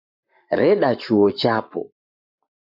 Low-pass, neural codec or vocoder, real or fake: 5.4 kHz; codec, 44.1 kHz, 7.8 kbps, Pupu-Codec; fake